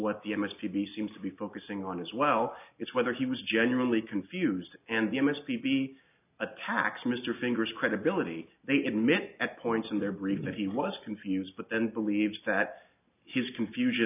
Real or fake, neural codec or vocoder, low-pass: real; none; 3.6 kHz